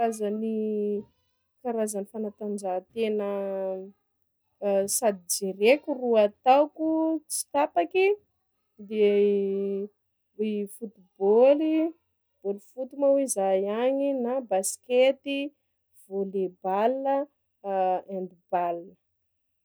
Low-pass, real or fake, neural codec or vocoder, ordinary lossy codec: none; real; none; none